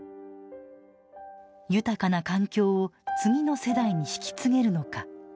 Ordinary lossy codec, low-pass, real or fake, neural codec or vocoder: none; none; real; none